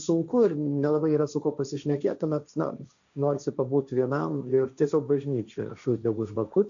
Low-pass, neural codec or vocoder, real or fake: 7.2 kHz; codec, 16 kHz, 1.1 kbps, Voila-Tokenizer; fake